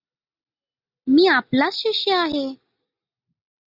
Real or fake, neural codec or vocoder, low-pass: real; none; 5.4 kHz